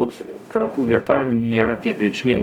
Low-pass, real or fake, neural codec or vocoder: 19.8 kHz; fake; codec, 44.1 kHz, 0.9 kbps, DAC